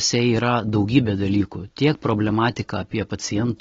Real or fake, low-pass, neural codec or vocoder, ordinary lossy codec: real; 7.2 kHz; none; AAC, 24 kbps